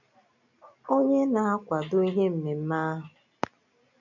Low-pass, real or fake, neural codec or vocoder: 7.2 kHz; real; none